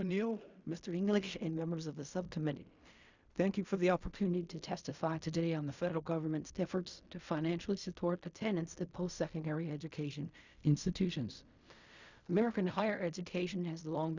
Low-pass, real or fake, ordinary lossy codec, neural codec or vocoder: 7.2 kHz; fake; Opus, 64 kbps; codec, 16 kHz in and 24 kHz out, 0.4 kbps, LongCat-Audio-Codec, fine tuned four codebook decoder